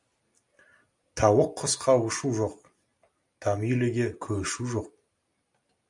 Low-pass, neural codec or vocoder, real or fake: 10.8 kHz; none; real